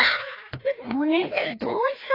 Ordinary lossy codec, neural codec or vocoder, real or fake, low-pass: AAC, 24 kbps; codec, 16 kHz, 1 kbps, FreqCodec, larger model; fake; 5.4 kHz